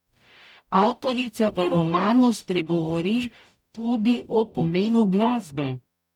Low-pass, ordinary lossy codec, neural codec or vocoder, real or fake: 19.8 kHz; none; codec, 44.1 kHz, 0.9 kbps, DAC; fake